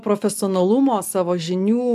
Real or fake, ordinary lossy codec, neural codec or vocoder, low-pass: real; AAC, 96 kbps; none; 14.4 kHz